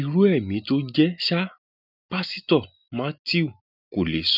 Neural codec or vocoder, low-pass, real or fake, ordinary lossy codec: none; 5.4 kHz; real; none